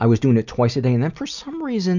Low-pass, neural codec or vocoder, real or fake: 7.2 kHz; none; real